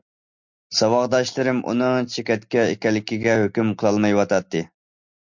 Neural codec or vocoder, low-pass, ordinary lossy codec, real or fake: none; 7.2 kHz; MP3, 48 kbps; real